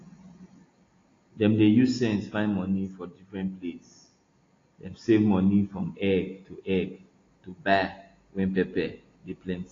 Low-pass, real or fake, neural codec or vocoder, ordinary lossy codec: 7.2 kHz; real; none; AAC, 32 kbps